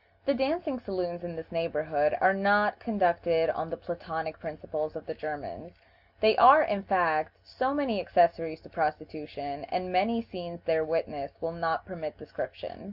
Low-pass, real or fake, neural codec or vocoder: 5.4 kHz; real; none